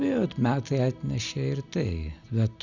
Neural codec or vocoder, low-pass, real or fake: none; 7.2 kHz; real